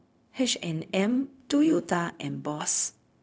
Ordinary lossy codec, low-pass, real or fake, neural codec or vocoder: none; none; fake; codec, 16 kHz, 0.4 kbps, LongCat-Audio-Codec